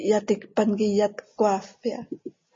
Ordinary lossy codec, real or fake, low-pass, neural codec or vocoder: MP3, 32 kbps; real; 7.2 kHz; none